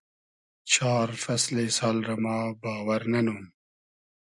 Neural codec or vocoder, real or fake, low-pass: none; real; 10.8 kHz